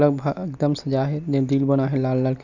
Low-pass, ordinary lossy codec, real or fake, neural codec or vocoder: 7.2 kHz; none; real; none